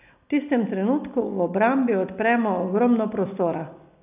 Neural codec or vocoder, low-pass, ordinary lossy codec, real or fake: none; 3.6 kHz; none; real